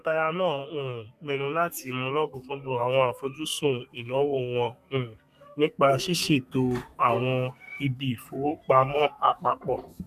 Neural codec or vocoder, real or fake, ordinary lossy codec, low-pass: codec, 32 kHz, 1.9 kbps, SNAC; fake; none; 14.4 kHz